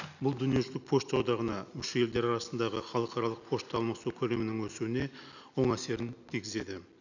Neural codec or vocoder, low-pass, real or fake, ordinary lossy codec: none; 7.2 kHz; real; none